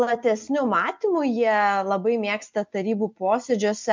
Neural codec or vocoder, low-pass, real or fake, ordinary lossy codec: none; 7.2 kHz; real; MP3, 64 kbps